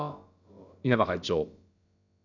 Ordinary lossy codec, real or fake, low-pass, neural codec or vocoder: none; fake; 7.2 kHz; codec, 16 kHz, about 1 kbps, DyCAST, with the encoder's durations